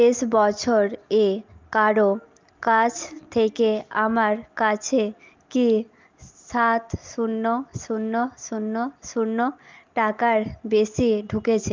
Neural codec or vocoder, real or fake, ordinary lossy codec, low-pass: none; real; Opus, 24 kbps; 7.2 kHz